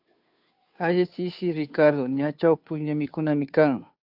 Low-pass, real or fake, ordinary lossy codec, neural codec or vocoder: 5.4 kHz; fake; AAC, 48 kbps; codec, 16 kHz, 2 kbps, FunCodec, trained on Chinese and English, 25 frames a second